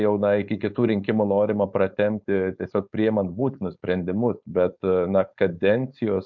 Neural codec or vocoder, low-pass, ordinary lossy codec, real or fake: codec, 16 kHz, 4.8 kbps, FACodec; 7.2 kHz; MP3, 64 kbps; fake